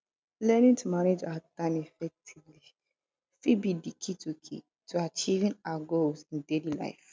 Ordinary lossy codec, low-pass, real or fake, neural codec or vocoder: none; none; real; none